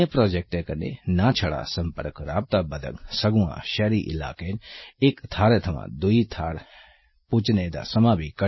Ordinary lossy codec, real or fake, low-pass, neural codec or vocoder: MP3, 24 kbps; real; 7.2 kHz; none